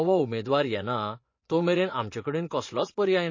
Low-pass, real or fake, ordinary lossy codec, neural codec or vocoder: 7.2 kHz; fake; MP3, 32 kbps; vocoder, 24 kHz, 100 mel bands, Vocos